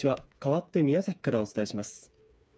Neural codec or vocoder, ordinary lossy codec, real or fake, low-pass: codec, 16 kHz, 4 kbps, FreqCodec, smaller model; none; fake; none